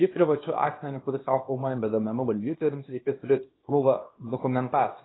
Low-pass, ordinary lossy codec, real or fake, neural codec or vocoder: 7.2 kHz; AAC, 16 kbps; fake; codec, 24 kHz, 0.9 kbps, WavTokenizer, small release